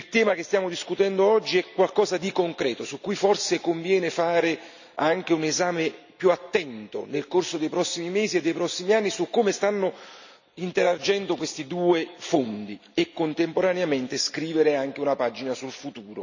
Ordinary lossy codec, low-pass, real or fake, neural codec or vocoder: none; 7.2 kHz; real; none